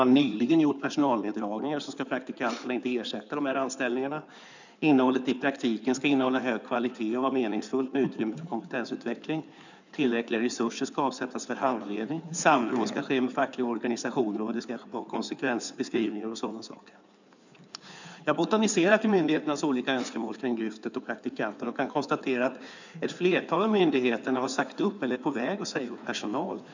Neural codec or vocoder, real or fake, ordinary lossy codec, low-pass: codec, 16 kHz in and 24 kHz out, 2.2 kbps, FireRedTTS-2 codec; fake; none; 7.2 kHz